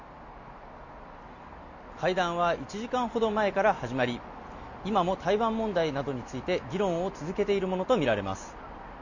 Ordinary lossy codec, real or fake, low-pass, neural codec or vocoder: none; real; 7.2 kHz; none